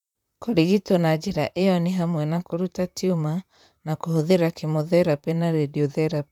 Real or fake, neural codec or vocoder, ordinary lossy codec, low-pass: fake; vocoder, 44.1 kHz, 128 mel bands, Pupu-Vocoder; none; 19.8 kHz